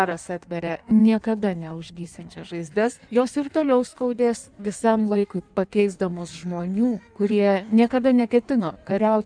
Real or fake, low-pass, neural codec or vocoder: fake; 9.9 kHz; codec, 16 kHz in and 24 kHz out, 1.1 kbps, FireRedTTS-2 codec